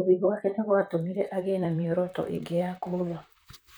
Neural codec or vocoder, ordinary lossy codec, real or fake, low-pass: vocoder, 44.1 kHz, 128 mel bands, Pupu-Vocoder; none; fake; none